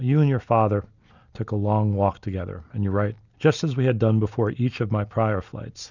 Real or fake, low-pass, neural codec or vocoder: real; 7.2 kHz; none